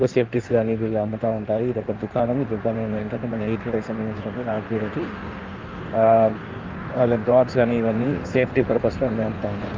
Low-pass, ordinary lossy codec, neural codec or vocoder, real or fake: 7.2 kHz; Opus, 24 kbps; codec, 16 kHz in and 24 kHz out, 1.1 kbps, FireRedTTS-2 codec; fake